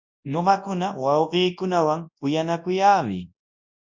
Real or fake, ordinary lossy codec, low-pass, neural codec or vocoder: fake; MP3, 48 kbps; 7.2 kHz; codec, 24 kHz, 0.9 kbps, WavTokenizer, large speech release